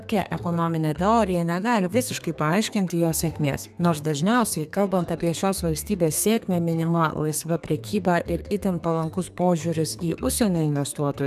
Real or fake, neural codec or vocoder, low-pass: fake; codec, 32 kHz, 1.9 kbps, SNAC; 14.4 kHz